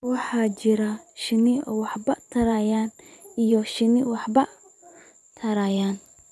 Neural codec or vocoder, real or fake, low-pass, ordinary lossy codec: vocoder, 24 kHz, 100 mel bands, Vocos; fake; none; none